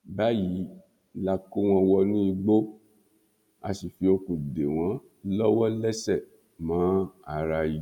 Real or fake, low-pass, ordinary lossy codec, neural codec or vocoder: real; 19.8 kHz; none; none